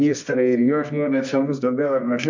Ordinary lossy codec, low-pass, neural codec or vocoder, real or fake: MP3, 64 kbps; 7.2 kHz; codec, 24 kHz, 0.9 kbps, WavTokenizer, medium music audio release; fake